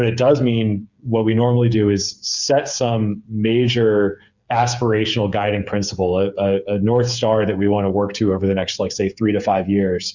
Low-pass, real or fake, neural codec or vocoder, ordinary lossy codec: 7.2 kHz; fake; codec, 16 kHz, 8 kbps, FreqCodec, smaller model; Opus, 64 kbps